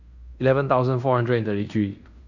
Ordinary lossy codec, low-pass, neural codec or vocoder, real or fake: none; 7.2 kHz; codec, 16 kHz in and 24 kHz out, 0.9 kbps, LongCat-Audio-Codec, fine tuned four codebook decoder; fake